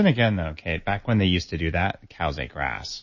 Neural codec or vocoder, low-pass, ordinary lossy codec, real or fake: none; 7.2 kHz; MP3, 32 kbps; real